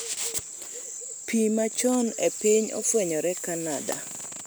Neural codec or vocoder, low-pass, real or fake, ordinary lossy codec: none; none; real; none